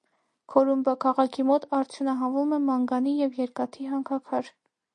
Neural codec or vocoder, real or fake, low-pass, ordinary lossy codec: none; real; 10.8 kHz; MP3, 48 kbps